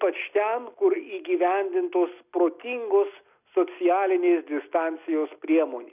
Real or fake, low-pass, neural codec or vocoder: real; 3.6 kHz; none